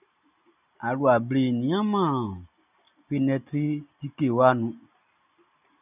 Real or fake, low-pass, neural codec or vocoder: real; 3.6 kHz; none